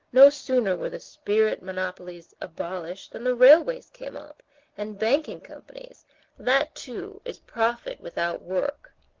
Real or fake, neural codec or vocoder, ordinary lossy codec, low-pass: real; none; Opus, 16 kbps; 7.2 kHz